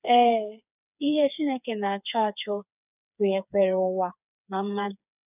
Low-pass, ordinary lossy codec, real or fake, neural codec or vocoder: 3.6 kHz; none; fake; codec, 16 kHz, 4 kbps, FreqCodec, smaller model